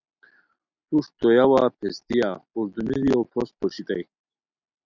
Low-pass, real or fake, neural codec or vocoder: 7.2 kHz; real; none